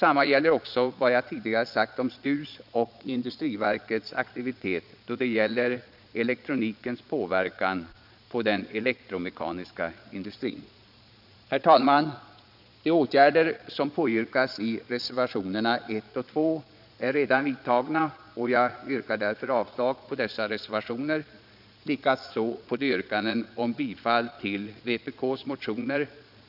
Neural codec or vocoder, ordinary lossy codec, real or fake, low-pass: vocoder, 22.05 kHz, 80 mel bands, Vocos; none; fake; 5.4 kHz